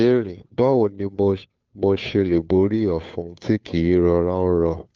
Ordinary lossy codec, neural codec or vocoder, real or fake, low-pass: Opus, 24 kbps; codec, 16 kHz, 2 kbps, FunCodec, trained on Chinese and English, 25 frames a second; fake; 7.2 kHz